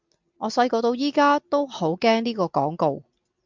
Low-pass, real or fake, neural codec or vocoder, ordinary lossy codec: 7.2 kHz; real; none; MP3, 64 kbps